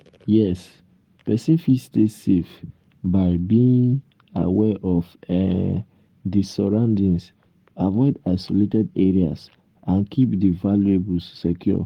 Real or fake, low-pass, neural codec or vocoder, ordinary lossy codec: fake; 19.8 kHz; codec, 44.1 kHz, 7.8 kbps, Pupu-Codec; Opus, 24 kbps